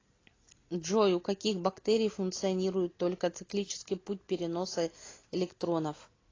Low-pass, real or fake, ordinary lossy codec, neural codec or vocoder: 7.2 kHz; real; AAC, 32 kbps; none